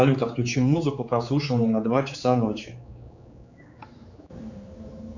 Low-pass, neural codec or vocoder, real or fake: 7.2 kHz; codec, 16 kHz, 4 kbps, X-Codec, HuBERT features, trained on general audio; fake